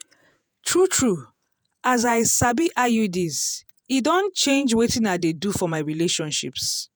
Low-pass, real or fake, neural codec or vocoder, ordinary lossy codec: none; fake; vocoder, 48 kHz, 128 mel bands, Vocos; none